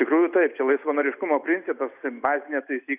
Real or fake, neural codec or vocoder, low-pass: real; none; 3.6 kHz